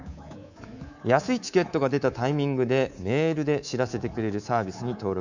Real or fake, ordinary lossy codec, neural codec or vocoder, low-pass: fake; none; codec, 24 kHz, 3.1 kbps, DualCodec; 7.2 kHz